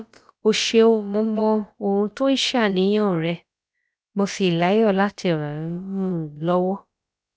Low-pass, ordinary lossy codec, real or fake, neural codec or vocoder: none; none; fake; codec, 16 kHz, about 1 kbps, DyCAST, with the encoder's durations